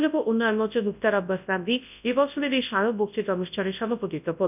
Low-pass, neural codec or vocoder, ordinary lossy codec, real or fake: 3.6 kHz; codec, 24 kHz, 0.9 kbps, WavTokenizer, large speech release; none; fake